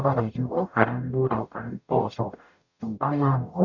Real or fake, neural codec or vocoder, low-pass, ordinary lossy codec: fake; codec, 44.1 kHz, 0.9 kbps, DAC; 7.2 kHz; none